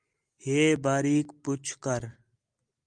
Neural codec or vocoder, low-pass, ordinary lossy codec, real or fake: none; 9.9 kHz; Opus, 32 kbps; real